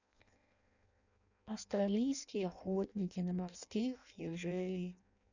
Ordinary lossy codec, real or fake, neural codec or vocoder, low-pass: none; fake; codec, 16 kHz in and 24 kHz out, 0.6 kbps, FireRedTTS-2 codec; 7.2 kHz